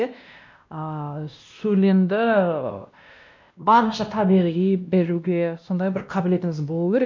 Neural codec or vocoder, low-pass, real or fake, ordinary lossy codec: codec, 16 kHz, 1 kbps, X-Codec, WavLM features, trained on Multilingual LibriSpeech; 7.2 kHz; fake; MP3, 64 kbps